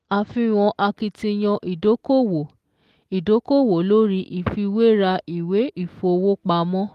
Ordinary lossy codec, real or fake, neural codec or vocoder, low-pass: Opus, 24 kbps; real; none; 14.4 kHz